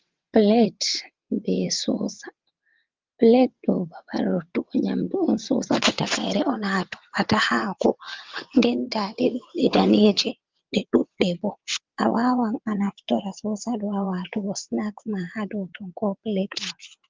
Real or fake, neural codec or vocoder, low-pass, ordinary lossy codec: fake; vocoder, 22.05 kHz, 80 mel bands, WaveNeXt; 7.2 kHz; Opus, 32 kbps